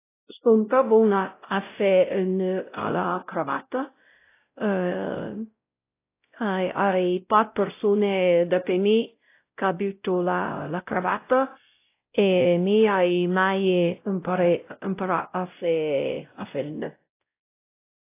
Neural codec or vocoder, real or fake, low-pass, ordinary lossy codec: codec, 16 kHz, 0.5 kbps, X-Codec, WavLM features, trained on Multilingual LibriSpeech; fake; 3.6 kHz; AAC, 24 kbps